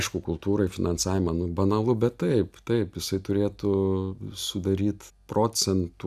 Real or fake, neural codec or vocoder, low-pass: real; none; 14.4 kHz